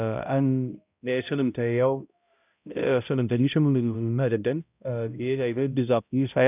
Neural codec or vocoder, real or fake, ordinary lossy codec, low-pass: codec, 16 kHz, 0.5 kbps, X-Codec, HuBERT features, trained on balanced general audio; fake; none; 3.6 kHz